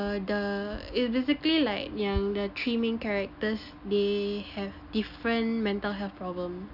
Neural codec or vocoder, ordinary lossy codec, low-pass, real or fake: none; none; 5.4 kHz; real